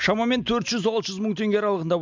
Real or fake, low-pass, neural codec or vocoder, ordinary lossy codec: real; 7.2 kHz; none; MP3, 64 kbps